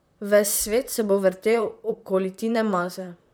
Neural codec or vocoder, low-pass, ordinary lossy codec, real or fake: vocoder, 44.1 kHz, 128 mel bands, Pupu-Vocoder; none; none; fake